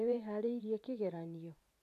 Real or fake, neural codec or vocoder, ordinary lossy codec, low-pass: fake; vocoder, 44.1 kHz, 128 mel bands every 512 samples, BigVGAN v2; none; 14.4 kHz